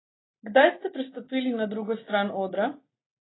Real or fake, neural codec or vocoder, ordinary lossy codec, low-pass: real; none; AAC, 16 kbps; 7.2 kHz